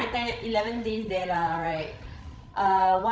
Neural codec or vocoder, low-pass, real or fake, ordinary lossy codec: codec, 16 kHz, 16 kbps, FreqCodec, larger model; none; fake; none